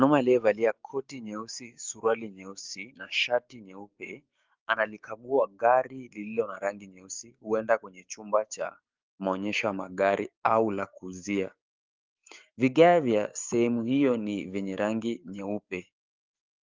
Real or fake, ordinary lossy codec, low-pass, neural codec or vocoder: fake; Opus, 32 kbps; 7.2 kHz; codec, 16 kHz, 6 kbps, DAC